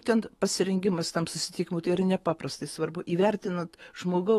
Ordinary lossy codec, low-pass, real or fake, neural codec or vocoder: AAC, 32 kbps; 19.8 kHz; fake; autoencoder, 48 kHz, 128 numbers a frame, DAC-VAE, trained on Japanese speech